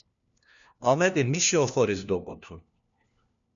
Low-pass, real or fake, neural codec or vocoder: 7.2 kHz; fake; codec, 16 kHz, 1 kbps, FunCodec, trained on LibriTTS, 50 frames a second